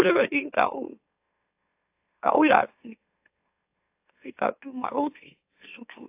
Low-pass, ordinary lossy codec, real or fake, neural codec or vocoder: 3.6 kHz; AAC, 32 kbps; fake; autoencoder, 44.1 kHz, a latent of 192 numbers a frame, MeloTTS